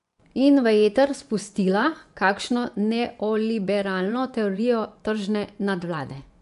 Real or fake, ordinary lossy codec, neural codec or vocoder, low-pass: fake; none; vocoder, 24 kHz, 100 mel bands, Vocos; 10.8 kHz